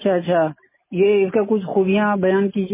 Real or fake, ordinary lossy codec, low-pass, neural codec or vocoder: real; MP3, 16 kbps; 3.6 kHz; none